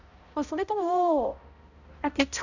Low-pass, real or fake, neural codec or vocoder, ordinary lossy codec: 7.2 kHz; fake; codec, 16 kHz, 0.5 kbps, X-Codec, HuBERT features, trained on balanced general audio; none